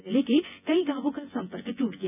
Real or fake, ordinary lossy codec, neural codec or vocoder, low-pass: fake; none; vocoder, 24 kHz, 100 mel bands, Vocos; 3.6 kHz